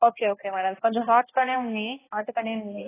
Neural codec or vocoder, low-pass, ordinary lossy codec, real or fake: codec, 16 kHz, 1 kbps, X-Codec, HuBERT features, trained on balanced general audio; 3.6 kHz; AAC, 16 kbps; fake